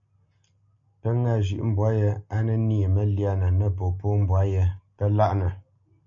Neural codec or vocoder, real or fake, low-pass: none; real; 7.2 kHz